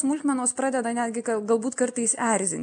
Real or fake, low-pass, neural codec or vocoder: real; 9.9 kHz; none